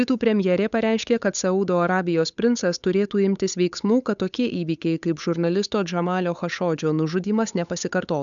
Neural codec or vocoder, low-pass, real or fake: codec, 16 kHz, 8 kbps, FunCodec, trained on Chinese and English, 25 frames a second; 7.2 kHz; fake